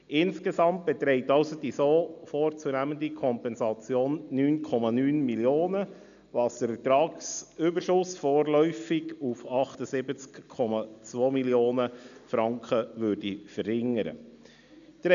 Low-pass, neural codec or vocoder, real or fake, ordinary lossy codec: 7.2 kHz; none; real; none